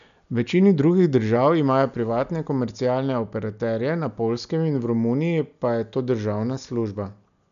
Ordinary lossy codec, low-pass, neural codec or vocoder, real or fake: none; 7.2 kHz; none; real